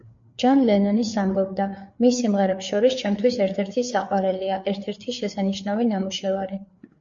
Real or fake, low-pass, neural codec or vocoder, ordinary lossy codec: fake; 7.2 kHz; codec, 16 kHz, 4 kbps, FreqCodec, larger model; MP3, 48 kbps